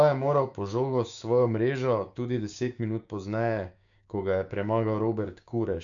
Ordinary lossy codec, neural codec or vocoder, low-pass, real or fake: none; codec, 16 kHz, 6 kbps, DAC; 7.2 kHz; fake